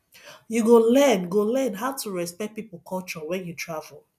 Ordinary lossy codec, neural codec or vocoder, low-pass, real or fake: none; none; 14.4 kHz; real